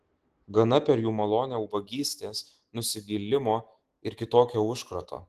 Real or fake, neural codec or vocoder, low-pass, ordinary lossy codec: fake; autoencoder, 48 kHz, 128 numbers a frame, DAC-VAE, trained on Japanese speech; 14.4 kHz; Opus, 16 kbps